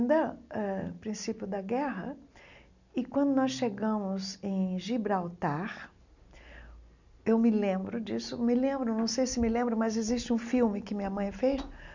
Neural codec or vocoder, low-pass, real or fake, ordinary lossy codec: none; 7.2 kHz; real; none